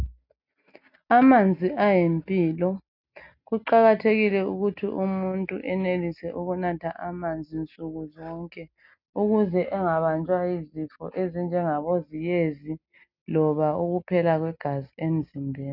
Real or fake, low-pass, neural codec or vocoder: real; 5.4 kHz; none